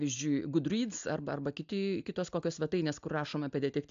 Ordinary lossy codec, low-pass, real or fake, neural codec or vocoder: AAC, 64 kbps; 7.2 kHz; real; none